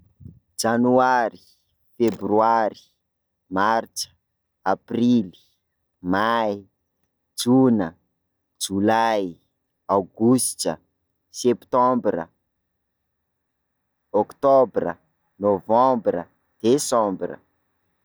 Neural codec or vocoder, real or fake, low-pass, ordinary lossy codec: none; real; none; none